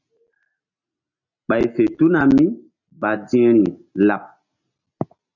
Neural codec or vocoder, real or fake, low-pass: none; real; 7.2 kHz